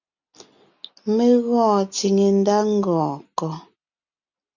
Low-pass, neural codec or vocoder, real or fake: 7.2 kHz; none; real